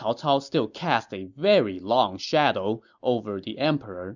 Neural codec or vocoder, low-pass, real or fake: none; 7.2 kHz; real